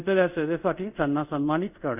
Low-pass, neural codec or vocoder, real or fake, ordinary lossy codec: 3.6 kHz; codec, 24 kHz, 0.5 kbps, DualCodec; fake; none